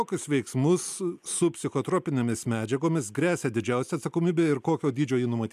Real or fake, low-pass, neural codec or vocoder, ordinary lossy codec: real; 14.4 kHz; none; MP3, 96 kbps